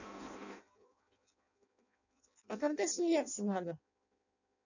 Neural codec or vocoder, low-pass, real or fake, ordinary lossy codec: codec, 16 kHz in and 24 kHz out, 0.6 kbps, FireRedTTS-2 codec; 7.2 kHz; fake; none